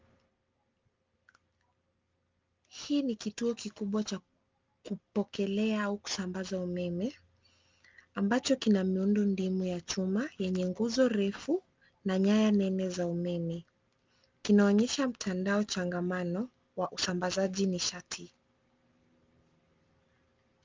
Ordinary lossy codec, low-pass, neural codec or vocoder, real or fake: Opus, 24 kbps; 7.2 kHz; none; real